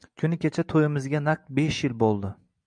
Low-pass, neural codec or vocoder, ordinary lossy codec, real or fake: 9.9 kHz; none; MP3, 96 kbps; real